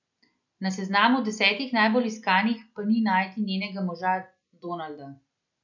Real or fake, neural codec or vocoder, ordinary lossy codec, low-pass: real; none; none; 7.2 kHz